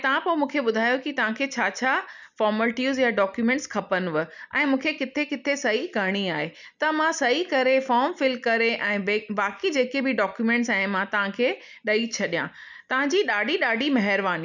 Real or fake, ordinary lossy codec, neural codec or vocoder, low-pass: real; none; none; 7.2 kHz